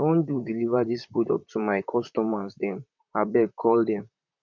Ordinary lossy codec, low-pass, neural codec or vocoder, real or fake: none; 7.2 kHz; vocoder, 44.1 kHz, 128 mel bands, Pupu-Vocoder; fake